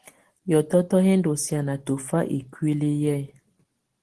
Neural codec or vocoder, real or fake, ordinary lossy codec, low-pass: none; real; Opus, 16 kbps; 10.8 kHz